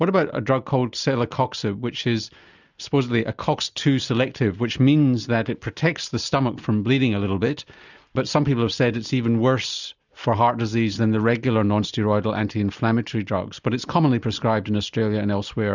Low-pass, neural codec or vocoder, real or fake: 7.2 kHz; none; real